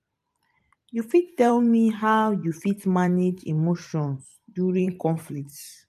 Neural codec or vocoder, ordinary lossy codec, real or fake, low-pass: vocoder, 44.1 kHz, 128 mel bands every 512 samples, BigVGAN v2; MP3, 64 kbps; fake; 14.4 kHz